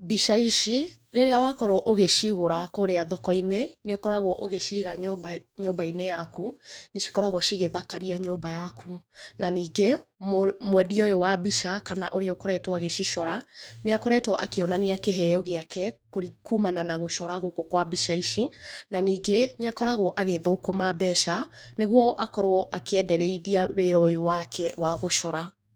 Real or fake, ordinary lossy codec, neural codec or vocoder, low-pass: fake; none; codec, 44.1 kHz, 2.6 kbps, DAC; none